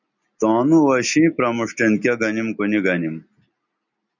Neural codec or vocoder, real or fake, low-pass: none; real; 7.2 kHz